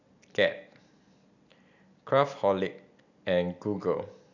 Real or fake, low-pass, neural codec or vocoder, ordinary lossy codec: real; 7.2 kHz; none; none